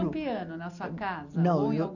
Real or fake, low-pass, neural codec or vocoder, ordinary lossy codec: real; 7.2 kHz; none; none